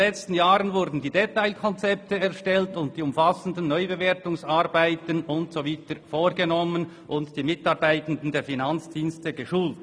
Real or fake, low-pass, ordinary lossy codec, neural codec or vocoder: real; none; none; none